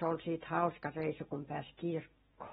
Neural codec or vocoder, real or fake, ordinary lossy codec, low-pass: none; real; AAC, 16 kbps; 19.8 kHz